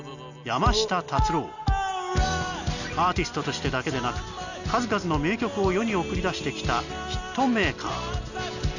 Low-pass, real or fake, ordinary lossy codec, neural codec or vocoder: 7.2 kHz; real; none; none